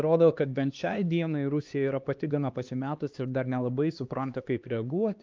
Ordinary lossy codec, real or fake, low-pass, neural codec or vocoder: Opus, 24 kbps; fake; 7.2 kHz; codec, 16 kHz, 2 kbps, X-Codec, HuBERT features, trained on LibriSpeech